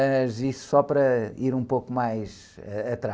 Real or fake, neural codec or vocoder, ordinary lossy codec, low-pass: real; none; none; none